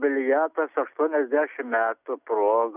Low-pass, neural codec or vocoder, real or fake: 3.6 kHz; none; real